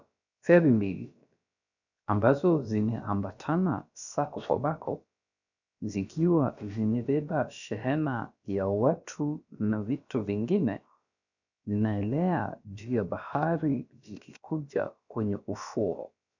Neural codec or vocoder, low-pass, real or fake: codec, 16 kHz, about 1 kbps, DyCAST, with the encoder's durations; 7.2 kHz; fake